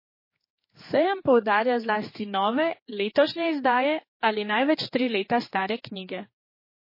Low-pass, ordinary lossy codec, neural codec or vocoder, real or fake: 5.4 kHz; MP3, 24 kbps; codec, 16 kHz, 4 kbps, X-Codec, HuBERT features, trained on general audio; fake